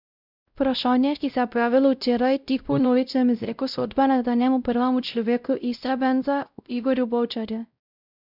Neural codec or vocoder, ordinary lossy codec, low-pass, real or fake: codec, 16 kHz, 0.5 kbps, X-Codec, WavLM features, trained on Multilingual LibriSpeech; none; 5.4 kHz; fake